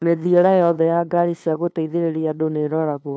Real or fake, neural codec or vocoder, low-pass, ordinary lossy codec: fake; codec, 16 kHz, 2 kbps, FunCodec, trained on LibriTTS, 25 frames a second; none; none